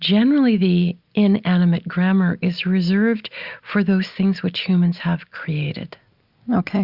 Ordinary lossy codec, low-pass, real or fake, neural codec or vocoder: Opus, 64 kbps; 5.4 kHz; real; none